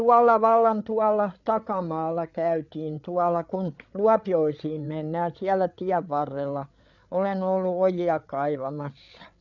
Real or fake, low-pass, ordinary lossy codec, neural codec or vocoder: fake; 7.2 kHz; none; codec, 16 kHz, 8 kbps, FreqCodec, larger model